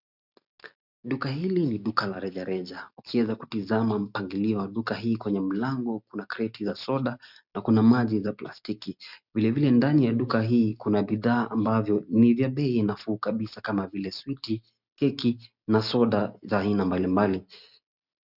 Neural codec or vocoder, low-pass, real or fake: none; 5.4 kHz; real